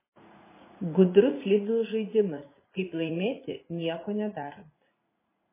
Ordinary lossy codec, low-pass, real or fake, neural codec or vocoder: MP3, 16 kbps; 3.6 kHz; fake; vocoder, 44.1 kHz, 80 mel bands, Vocos